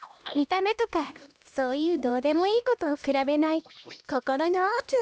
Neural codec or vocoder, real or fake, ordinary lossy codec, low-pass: codec, 16 kHz, 1 kbps, X-Codec, HuBERT features, trained on LibriSpeech; fake; none; none